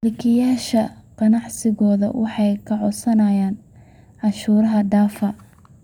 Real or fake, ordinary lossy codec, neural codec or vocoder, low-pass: fake; none; vocoder, 44.1 kHz, 128 mel bands every 256 samples, BigVGAN v2; 19.8 kHz